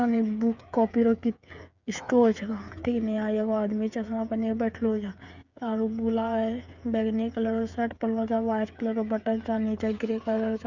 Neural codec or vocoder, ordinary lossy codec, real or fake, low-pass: codec, 16 kHz, 8 kbps, FreqCodec, smaller model; none; fake; 7.2 kHz